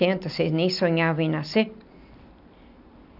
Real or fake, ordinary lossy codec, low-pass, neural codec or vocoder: real; none; 5.4 kHz; none